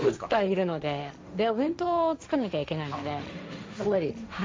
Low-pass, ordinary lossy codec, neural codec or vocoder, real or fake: none; none; codec, 16 kHz, 1.1 kbps, Voila-Tokenizer; fake